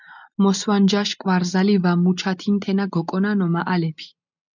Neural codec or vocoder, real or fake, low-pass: none; real; 7.2 kHz